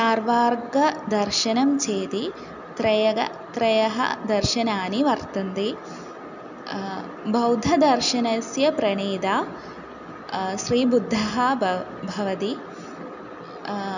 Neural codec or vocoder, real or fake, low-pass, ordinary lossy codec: none; real; 7.2 kHz; none